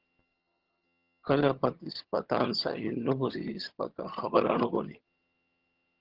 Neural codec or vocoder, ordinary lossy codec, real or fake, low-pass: vocoder, 22.05 kHz, 80 mel bands, HiFi-GAN; Opus, 16 kbps; fake; 5.4 kHz